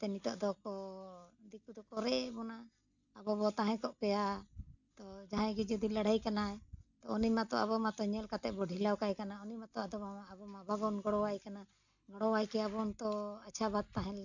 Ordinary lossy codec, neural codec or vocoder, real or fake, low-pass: none; none; real; 7.2 kHz